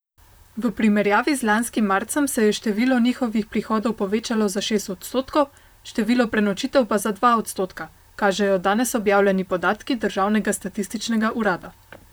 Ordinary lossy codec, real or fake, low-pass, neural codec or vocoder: none; fake; none; vocoder, 44.1 kHz, 128 mel bands, Pupu-Vocoder